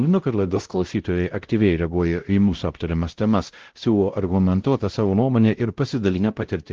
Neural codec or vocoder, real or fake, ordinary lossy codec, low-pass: codec, 16 kHz, 0.5 kbps, X-Codec, WavLM features, trained on Multilingual LibriSpeech; fake; Opus, 16 kbps; 7.2 kHz